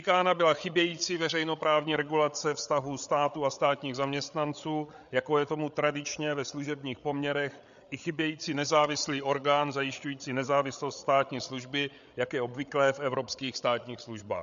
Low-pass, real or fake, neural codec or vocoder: 7.2 kHz; fake; codec, 16 kHz, 8 kbps, FreqCodec, larger model